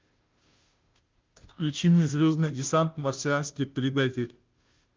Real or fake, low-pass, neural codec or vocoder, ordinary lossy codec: fake; 7.2 kHz; codec, 16 kHz, 0.5 kbps, FunCodec, trained on Chinese and English, 25 frames a second; Opus, 24 kbps